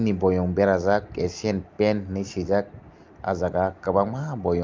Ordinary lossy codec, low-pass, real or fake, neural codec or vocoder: Opus, 24 kbps; 7.2 kHz; real; none